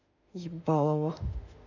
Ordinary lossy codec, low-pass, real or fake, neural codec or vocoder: none; 7.2 kHz; fake; autoencoder, 48 kHz, 32 numbers a frame, DAC-VAE, trained on Japanese speech